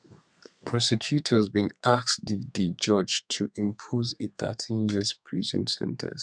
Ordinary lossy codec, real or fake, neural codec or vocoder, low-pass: none; fake; codec, 32 kHz, 1.9 kbps, SNAC; 9.9 kHz